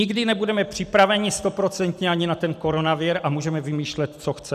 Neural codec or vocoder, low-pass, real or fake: none; 14.4 kHz; real